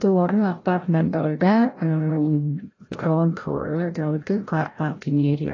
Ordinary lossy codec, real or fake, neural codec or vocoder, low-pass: AAC, 32 kbps; fake; codec, 16 kHz, 0.5 kbps, FreqCodec, larger model; 7.2 kHz